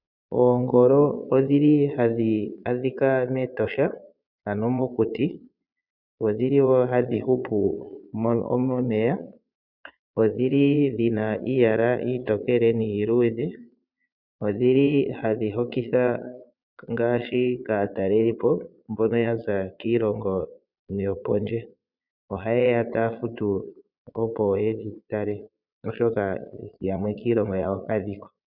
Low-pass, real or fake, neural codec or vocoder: 5.4 kHz; fake; vocoder, 22.05 kHz, 80 mel bands, Vocos